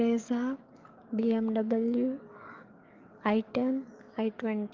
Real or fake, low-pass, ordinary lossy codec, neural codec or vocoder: fake; 7.2 kHz; Opus, 24 kbps; codec, 44.1 kHz, 7.8 kbps, DAC